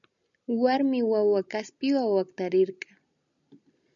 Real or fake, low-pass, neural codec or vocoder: real; 7.2 kHz; none